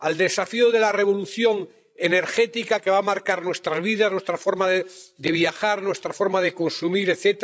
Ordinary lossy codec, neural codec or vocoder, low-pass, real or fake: none; codec, 16 kHz, 16 kbps, FreqCodec, larger model; none; fake